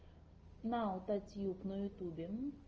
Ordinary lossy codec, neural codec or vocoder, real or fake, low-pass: Opus, 24 kbps; none; real; 7.2 kHz